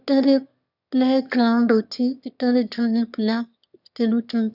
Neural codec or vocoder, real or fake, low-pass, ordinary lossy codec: autoencoder, 22.05 kHz, a latent of 192 numbers a frame, VITS, trained on one speaker; fake; 5.4 kHz; none